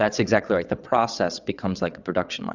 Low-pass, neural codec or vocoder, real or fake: 7.2 kHz; vocoder, 22.05 kHz, 80 mel bands, WaveNeXt; fake